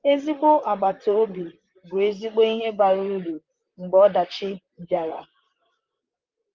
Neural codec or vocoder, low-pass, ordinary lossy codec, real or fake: vocoder, 44.1 kHz, 128 mel bands, Pupu-Vocoder; 7.2 kHz; Opus, 24 kbps; fake